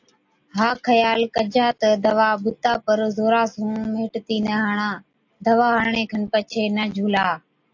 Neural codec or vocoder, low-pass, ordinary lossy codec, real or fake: none; 7.2 kHz; AAC, 48 kbps; real